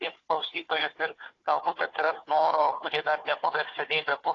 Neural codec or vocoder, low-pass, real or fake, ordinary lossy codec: codec, 16 kHz, 4 kbps, FunCodec, trained on Chinese and English, 50 frames a second; 7.2 kHz; fake; AAC, 32 kbps